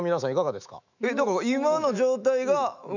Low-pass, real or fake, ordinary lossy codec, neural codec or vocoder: 7.2 kHz; fake; none; autoencoder, 48 kHz, 128 numbers a frame, DAC-VAE, trained on Japanese speech